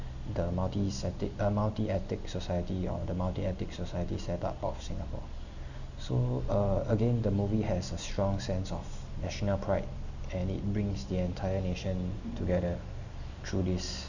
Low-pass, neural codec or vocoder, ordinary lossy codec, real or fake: 7.2 kHz; none; none; real